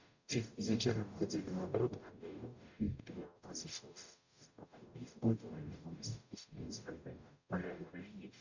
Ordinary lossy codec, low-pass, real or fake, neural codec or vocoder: none; 7.2 kHz; fake; codec, 44.1 kHz, 0.9 kbps, DAC